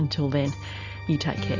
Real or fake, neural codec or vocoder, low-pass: real; none; 7.2 kHz